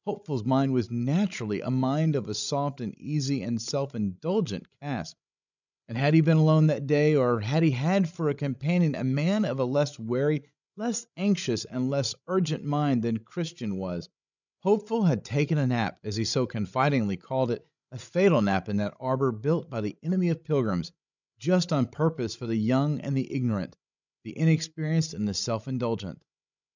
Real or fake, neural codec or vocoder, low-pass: fake; codec, 16 kHz, 16 kbps, FreqCodec, larger model; 7.2 kHz